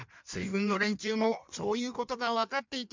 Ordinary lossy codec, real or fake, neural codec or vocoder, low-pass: MP3, 64 kbps; fake; codec, 16 kHz in and 24 kHz out, 1.1 kbps, FireRedTTS-2 codec; 7.2 kHz